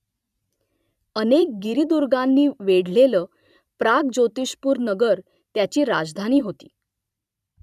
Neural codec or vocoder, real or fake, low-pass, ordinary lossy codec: vocoder, 44.1 kHz, 128 mel bands every 512 samples, BigVGAN v2; fake; 14.4 kHz; none